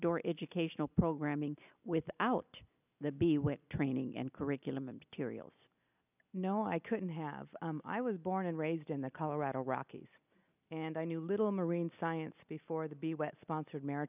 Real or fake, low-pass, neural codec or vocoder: real; 3.6 kHz; none